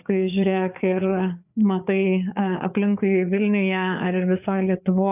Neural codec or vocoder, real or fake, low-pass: codec, 16 kHz, 4 kbps, FreqCodec, larger model; fake; 3.6 kHz